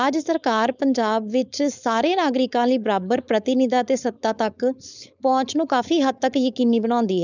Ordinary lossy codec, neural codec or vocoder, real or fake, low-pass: none; codec, 16 kHz, 4.8 kbps, FACodec; fake; 7.2 kHz